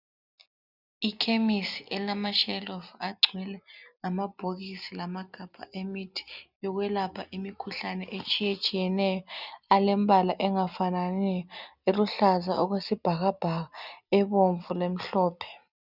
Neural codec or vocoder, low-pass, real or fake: none; 5.4 kHz; real